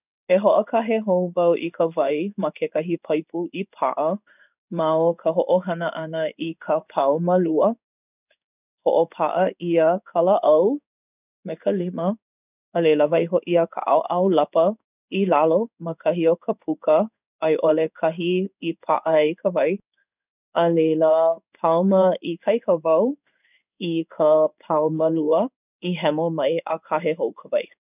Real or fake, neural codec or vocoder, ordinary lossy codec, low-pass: fake; codec, 16 kHz in and 24 kHz out, 1 kbps, XY-Tokenizer; none; 3.6 kHz